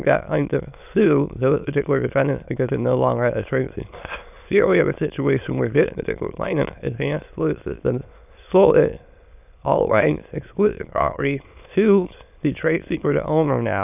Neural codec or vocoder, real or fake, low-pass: autoencoder, 22.05 kHz, a latent of 192 numbers a frame, VITS, trained on many speakers; fake; 3.6 kHz